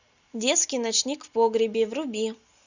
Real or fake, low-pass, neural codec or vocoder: real; 7.2 kHz; none